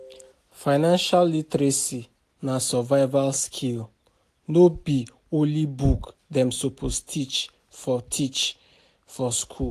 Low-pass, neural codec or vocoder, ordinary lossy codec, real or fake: 14.4 kHz; none; AAC, 64 kbps; real